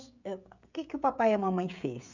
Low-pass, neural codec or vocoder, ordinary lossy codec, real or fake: 7.2 kHz; codec, 16 kHz, 16 kbps, FreqCodec, smaller model; none; fake